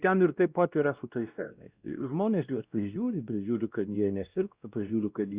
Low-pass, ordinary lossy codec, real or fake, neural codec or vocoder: 3.6 kHz; Opus, 64 kbps; fake; codec, 16 kHz, 1 kbps, X-Codec, WavLM features, trained on Multilingual LibriSpeech